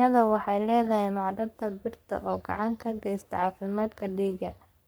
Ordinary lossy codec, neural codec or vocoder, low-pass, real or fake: none; codec, 44.1 kHz, 3.4 kbps, Pupu-Codec; none; fake